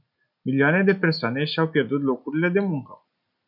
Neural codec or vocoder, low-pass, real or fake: none; 5.4 kHz; real